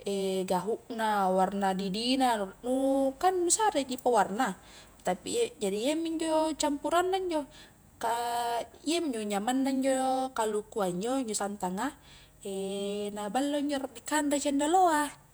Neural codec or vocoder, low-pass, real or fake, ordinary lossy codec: vocoder, 48 kHz, 128 mel bands, Vocos; none; fake; none